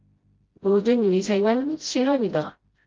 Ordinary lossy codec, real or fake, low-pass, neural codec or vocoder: Opus, 32 kbps; fake; 7.2 kHz; codec, 16 kHz, 0.5 kbps, FreqCodec, smaller model